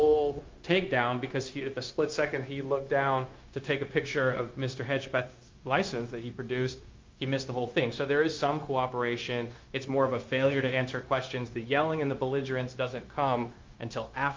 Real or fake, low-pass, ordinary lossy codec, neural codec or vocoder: fake; 7.2 kHz; Opus, 24 kbps; codec, 16 kHz, 0.9 kbps, LongCat-Audio-Codec